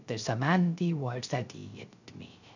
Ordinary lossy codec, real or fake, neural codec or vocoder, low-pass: none; fake; codec, 16 kHz, 0.3 kbps, FocalCodec; 7.2 kHz